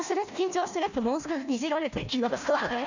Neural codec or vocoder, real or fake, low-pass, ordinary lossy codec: codec, 16 kHz, 1 kbps, FunCodec, trained on Chinese and English, 50 frames a second; fake; 7.2 kHz; none